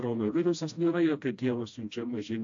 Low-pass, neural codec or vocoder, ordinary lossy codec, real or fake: 7.2 kHz; codec, 16 kHz, 1 kbps, FreqCodec, smaller model; AAC, 64 kbps; fake